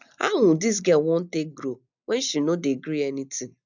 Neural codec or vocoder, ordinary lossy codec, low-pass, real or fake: none; none; 7.2 kHz; real